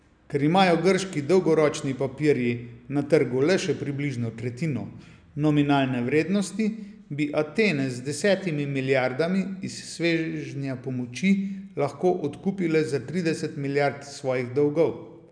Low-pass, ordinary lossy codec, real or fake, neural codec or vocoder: 9.9 kHz; none; real; none